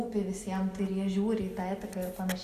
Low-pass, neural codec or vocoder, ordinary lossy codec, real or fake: 14.4 kHz; none; Opus, 64 kbps; real